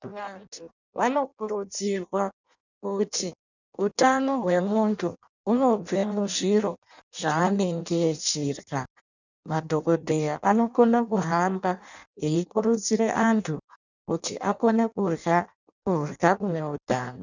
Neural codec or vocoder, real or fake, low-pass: codec, 16 kHz in and 24 kHz out, 0.6 kbps, FireRedTTS-2 codec; fake; 7.2 kHz